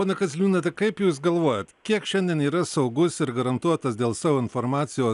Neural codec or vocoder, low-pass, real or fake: none; 10.8 kHz; real